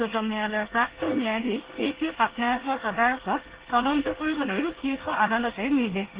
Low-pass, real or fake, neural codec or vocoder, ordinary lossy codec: 3.6 kHz; fake; codec, 24 kHz, 1 kbps, SNAC; Opus, 16 kbps